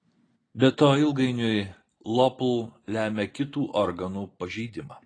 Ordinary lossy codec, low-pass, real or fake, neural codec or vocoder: AAC, 32 kbps; 9.9 kHz; real; none